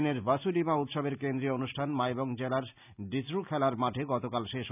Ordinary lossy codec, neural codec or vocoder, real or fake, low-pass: none; none; real; 3.6 kHz